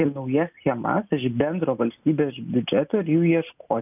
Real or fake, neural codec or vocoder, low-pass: real; none; 3.6 kHz